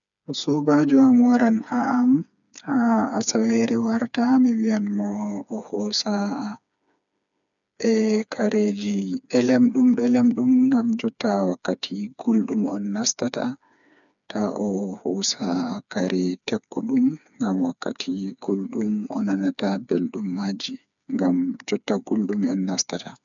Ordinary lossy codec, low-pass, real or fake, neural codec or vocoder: none; 7.2 kHz; fake; codec, 16 kHz, 4 kbps, FreqCodec, smaller model